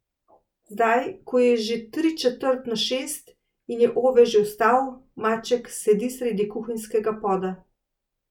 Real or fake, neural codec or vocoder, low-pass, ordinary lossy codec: real; none; 19.8 kHz; none